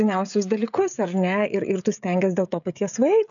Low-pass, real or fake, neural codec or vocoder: 7.2 kHz; fake; codec, 16 kHz, 16 kbps, FreqCodec, smaller model